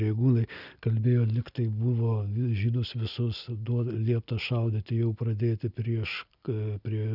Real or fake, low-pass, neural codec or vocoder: real; 5.4 kHz; none